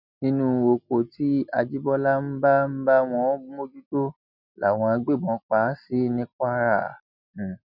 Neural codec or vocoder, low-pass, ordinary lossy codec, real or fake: none; 5.4 kHz; none; real